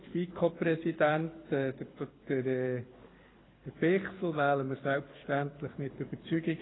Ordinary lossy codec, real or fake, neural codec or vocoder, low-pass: AAC, 16 kbps; fake; codec, 24 kHz, 6 kbps, HILCodec; 7.2 kHz